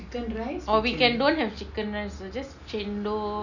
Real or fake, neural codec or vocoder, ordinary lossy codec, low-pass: real; none; none; 7.2 kHz